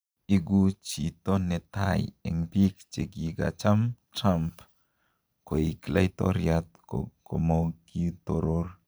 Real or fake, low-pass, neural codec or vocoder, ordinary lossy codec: fake; none; vocoder, 44.1 kHz, 128 mel bands every 512 samples, BigVGAN v2; none